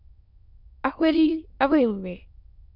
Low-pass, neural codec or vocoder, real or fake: 5.4 kHz; autoencoder, 22.05 kHz, a latent of 192 numbers a frame, VITS, trained on many speakers; fake